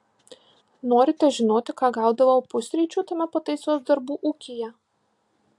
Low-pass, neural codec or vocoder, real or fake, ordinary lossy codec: 10.8 kHz; none; real; AAC, 64 kbps